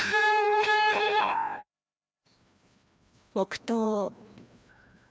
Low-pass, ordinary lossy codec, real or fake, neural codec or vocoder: none; none; fake; codec, 16 kHz, 1 kbps, FreqCodec, larger model